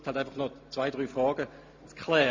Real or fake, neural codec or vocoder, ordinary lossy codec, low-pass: real; none; MP3, 48 kbps; 7.2 kHz